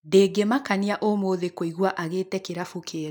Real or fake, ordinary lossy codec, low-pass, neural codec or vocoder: real; none; none; none